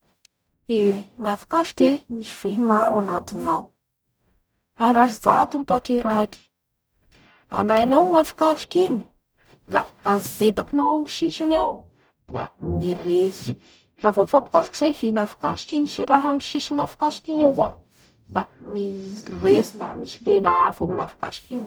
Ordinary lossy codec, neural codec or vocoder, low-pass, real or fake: none; codec, 44.1 kHz, 0.9 kbps, DAC; none; fake